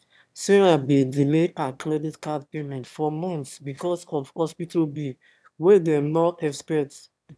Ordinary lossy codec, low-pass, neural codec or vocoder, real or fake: none; none; autoencoder, 22.05 kHz, a latent of 192 numbers a frame, VITS, trained on one speaker; fake